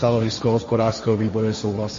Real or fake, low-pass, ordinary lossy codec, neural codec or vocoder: fake; 7.2 kHz; MP3, 32 kbps; codec, 16 kHz, 1.1 kbps, Voila-Tokenizer